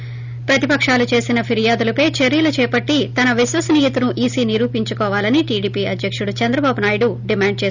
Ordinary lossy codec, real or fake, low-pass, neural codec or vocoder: none; real; 7.2 kHz; none